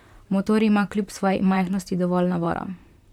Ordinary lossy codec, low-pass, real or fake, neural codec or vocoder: none; 19.8 kHz; fake; vocoder, 44.1 kHz, 128 mel bands, Pupu-Vocoder